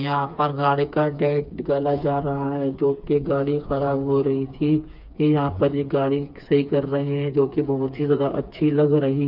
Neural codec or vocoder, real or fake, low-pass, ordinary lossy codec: codec, 16 kHz, 4 kbps, FreqCodec, smaller model; fake; 5.4 kHz; none